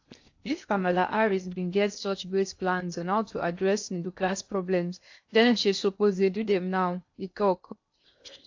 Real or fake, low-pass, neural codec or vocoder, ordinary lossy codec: fake; 7.2 kHz; codec, 16 kHz in and 24 kHz out, 0.6 kbps, FocalCodec, streaming, 2048 codes; AAC, 48 kbps